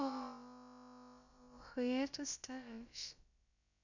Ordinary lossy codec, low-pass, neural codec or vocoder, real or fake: none; 7.2 kHz; codec, 16 kHz, about 1 kbps, DyCAST, with the encoder's durations; fake